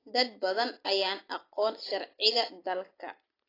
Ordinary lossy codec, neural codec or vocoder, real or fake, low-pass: AAC, 24 kbps; none; real; 5.4 kHz